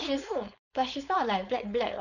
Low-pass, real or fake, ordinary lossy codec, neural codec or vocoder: 7.2 kHz; fake; none; codec, 16 kHz, 4.8 kbps, FACodec